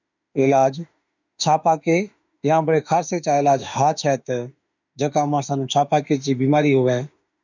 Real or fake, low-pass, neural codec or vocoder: fake; 7.2 kHz; autoencoder, 48 kHz, 32 numbers a frame, DAC-VAE, trained on Japanese speech